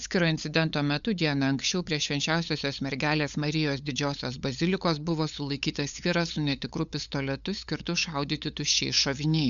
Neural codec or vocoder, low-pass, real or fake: codec, 16 kHz, 8 kbps, FunCodec, trained on LibriTTS, 25 frames a second; 7.2 kHz; fake